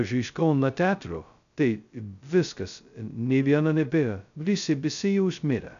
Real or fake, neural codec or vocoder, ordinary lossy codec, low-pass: fake; codec, 16 kHz, 0.2 kbps, FocalCodec; MP3, 96 kbps; 7.2 kHz